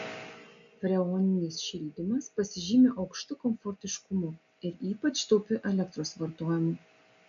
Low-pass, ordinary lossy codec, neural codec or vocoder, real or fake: 7.2 kHz; AAC, 64 kbps; none; real